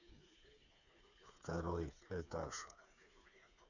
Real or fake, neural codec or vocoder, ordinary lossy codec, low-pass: fake; codec, 16 kHz, 4 kbps, FreqCodec, smaller model; none; 7.2 kHz